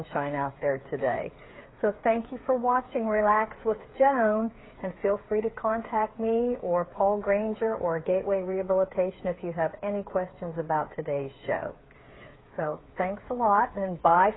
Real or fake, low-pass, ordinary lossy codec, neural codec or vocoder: fake; 7.2 kHz; AAC, 16 kbps; codec, 16 kHz, 8 kbps, FreqCodec, smaller model